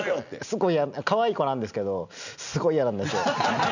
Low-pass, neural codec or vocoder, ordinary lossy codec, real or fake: 7.2 kHz; none; none; real